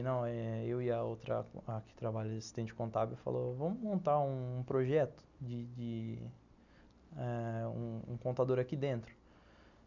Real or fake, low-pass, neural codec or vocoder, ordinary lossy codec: real; 7.2 kHz; none; none